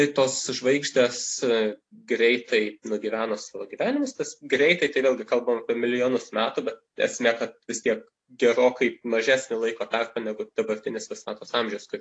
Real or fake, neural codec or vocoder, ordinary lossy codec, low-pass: real; none; AAC, 48 kbps; 9.9 kHz